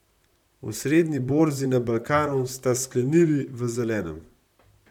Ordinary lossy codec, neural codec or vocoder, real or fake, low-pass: none; vocoder, 44.1 kHz, 128 mel bands, Pupu-Vocoder; fake; 19.8 kHz